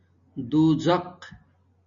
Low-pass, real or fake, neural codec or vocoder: 7.2 kHz; real; none